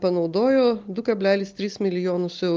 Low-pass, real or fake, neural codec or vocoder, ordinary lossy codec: 7.2 kHz; real; none; Opus, 32 kbps